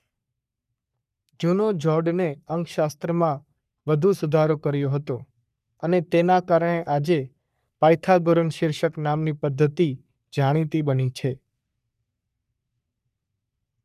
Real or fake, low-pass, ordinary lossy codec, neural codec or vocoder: fake; 14.4 kHz; none; codec, 44.1 kHz, 3.4 kbps, Pupu-Codec